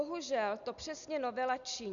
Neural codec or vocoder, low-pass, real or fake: none; 7.2 kHz; real